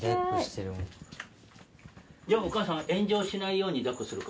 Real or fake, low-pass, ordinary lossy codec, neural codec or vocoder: real; none; none; none